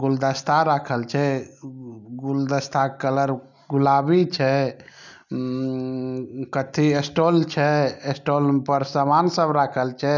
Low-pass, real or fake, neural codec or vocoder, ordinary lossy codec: 7.2 kHz; real; none; none